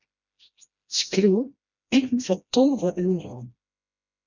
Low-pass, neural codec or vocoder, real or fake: 7.2 kHz; codec, 16 kHz, 1 kbps, FreqCodec, smaller model; fake